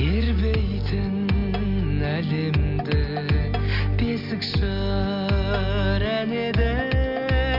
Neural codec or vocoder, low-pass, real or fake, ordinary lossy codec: none; 5.4 kHz; real; MP3, 48 kbps